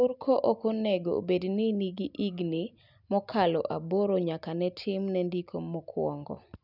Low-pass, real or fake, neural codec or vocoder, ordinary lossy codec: 5.4 kHz; real; none; none